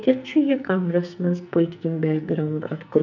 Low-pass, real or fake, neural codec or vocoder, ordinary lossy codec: 7.2 kHz; fake; codec, 44.1 kHz, 2.6 kbps, SNAC; none